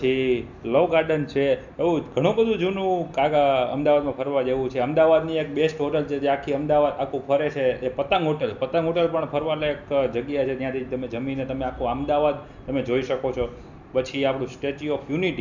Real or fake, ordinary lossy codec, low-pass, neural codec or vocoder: real; none; 7.2 kHz; none